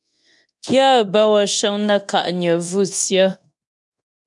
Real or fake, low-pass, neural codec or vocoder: fake; 10.8 kHz; codec, 24 kHz, 0.9 kbps, DualCodec